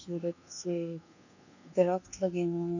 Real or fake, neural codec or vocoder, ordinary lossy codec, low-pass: fake; codec, 24 kHz, 1.2 kbps, DualCodec; none; 7.2 kHz